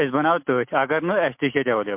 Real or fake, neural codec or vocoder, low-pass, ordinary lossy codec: real; none; 3.6 kHz; MP3, 32 kbps